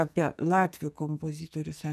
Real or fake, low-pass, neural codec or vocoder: fake; 14.4 kHz; codec, 44.1 kHz, 2.6 kbps, SNAC